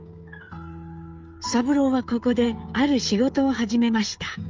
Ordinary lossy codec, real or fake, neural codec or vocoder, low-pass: Opus, 24 kbps; fake; codec, 16 kHz, 16 kbps, FreqCodec, smaller model; 7.2 kHz